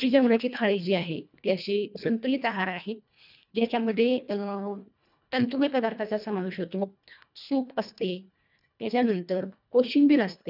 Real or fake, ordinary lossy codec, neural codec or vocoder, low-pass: fake; none; codec, 24 kHz, 1.5 kbps, HILCodec; 5.4 kHz